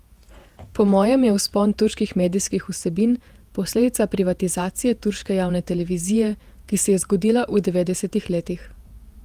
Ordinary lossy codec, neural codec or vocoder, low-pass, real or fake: Opus, 32 kbps; vocoder, 48 kHz, 128 mel bands, Vocos; 14.4 kHz; fake